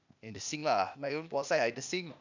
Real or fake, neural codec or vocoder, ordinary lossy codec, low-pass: fake; codec, 16 kHz, 0.8 kbps, ZipCodec; none; 7.2 kHz